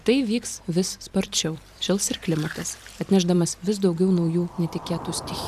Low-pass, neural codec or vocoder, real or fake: 14.4 kHz; vocoder, 44.1 kHz, 128 mel bands every 512 samples, BigVGAN v2; fake